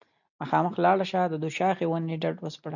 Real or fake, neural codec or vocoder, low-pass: real; none; 7.2 kHz